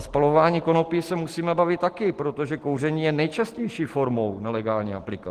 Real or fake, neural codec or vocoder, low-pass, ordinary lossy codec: real; none; 14.4 kHz; Opus, 16 kbps